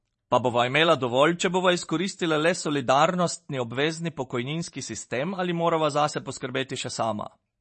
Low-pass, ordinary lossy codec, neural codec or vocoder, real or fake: 10.8 kHz; MP3, 32 kbps; vocoder, 44.1 kHz, 128 mel bands every 512 samples, BigVGAN v2; fake